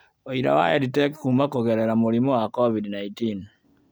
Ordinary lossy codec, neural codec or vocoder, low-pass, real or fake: none; none; none; real